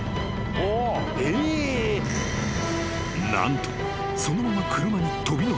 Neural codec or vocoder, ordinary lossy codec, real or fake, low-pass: none; none; real; none